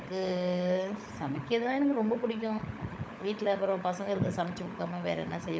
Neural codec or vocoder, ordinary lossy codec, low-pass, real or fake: codec, 16 kHz, 16 kbps, FunCodec, trained on LibriTTS, 50 frames a second; none; none; fake